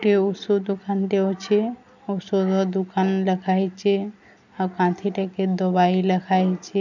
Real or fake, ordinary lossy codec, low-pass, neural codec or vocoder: real; none; 7.2 kHz; none